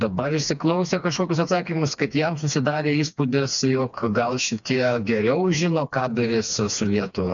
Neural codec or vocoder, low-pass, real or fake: codec, 16 kHz, 2 kbps, FreqCodec, smaller model; 7.2 kHz; fake